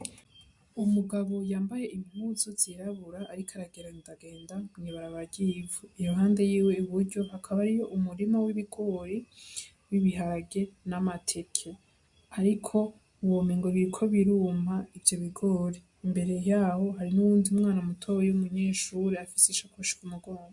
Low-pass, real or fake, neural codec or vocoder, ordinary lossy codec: 10.8 kHz; real; none; MP3, 64 kbps